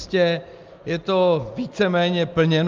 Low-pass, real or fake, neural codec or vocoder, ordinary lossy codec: 7.2 kHz; real; none; Opus, 32 kbps